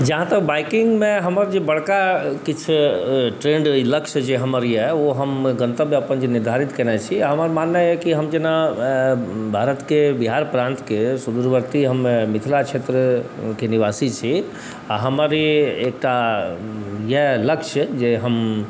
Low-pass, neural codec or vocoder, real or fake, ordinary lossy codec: none; none; real; none